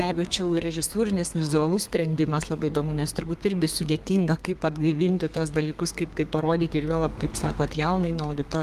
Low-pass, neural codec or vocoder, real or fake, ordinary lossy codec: 14.4 kHz; codec, 32 kHz, 1.9 kbps, SNAC; fake; Opus, 32 kbps